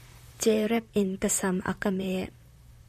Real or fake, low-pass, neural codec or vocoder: fake; 14.4 kHz; vocoder, 44.1 kHz, 128 mel bands, Pupu-Vocoder